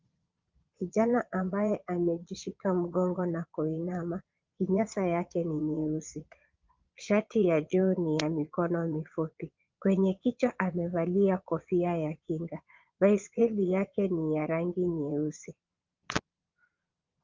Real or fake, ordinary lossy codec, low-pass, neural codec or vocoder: fake; Opus, 32 kbps; 7.2 kHz; vocoder, 22.05 kHz, 80 mel bands, Vocos